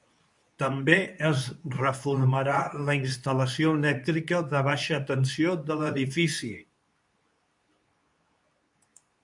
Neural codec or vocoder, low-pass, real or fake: codec, 24 kHz, 0.9 kbps, WavTokenizer, medium speech release version 2; 10.8 kHz; fake